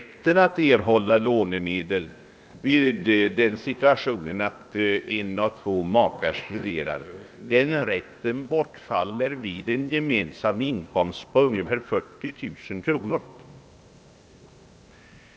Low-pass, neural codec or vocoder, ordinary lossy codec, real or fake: none; codec, 16 kHz, 0.8 kbps, ZipCodec; none; fake